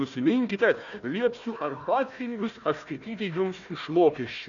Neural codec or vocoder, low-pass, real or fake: codec, 16 kHz, 1 kbps, FunCodec, trained on Chinese and English, 50 frames a second; 7.2 kHz; fake